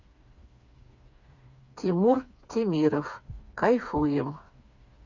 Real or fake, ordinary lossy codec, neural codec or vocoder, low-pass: fake; Opus, 64 kbps; codec, 16 kHz, 4 kbps, FreqCodec, smaller model; 7.2 kHz